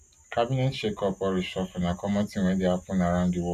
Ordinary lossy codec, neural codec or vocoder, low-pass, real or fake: none; none; 14.4 kHz; real